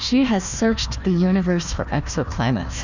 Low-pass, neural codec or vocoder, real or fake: 7.2 kHz; codec, 16 kHz, 1 kbps, FunCodec, trained on Chinese and English, 50 frames a second; fake